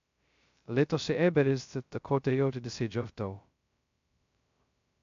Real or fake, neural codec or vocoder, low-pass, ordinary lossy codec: fake; codec, 16 kHz, 0.2 kbps, FocalCodec; 7.2 kHz; AAC, 64 kbps